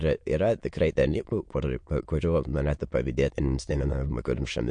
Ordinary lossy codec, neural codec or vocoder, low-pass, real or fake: MP3, 48 kbps; autoencoder, 22.05 kHz, a latent of 192 numbers a frame, VITS, trained on many speakers; 9.9 kHz; fake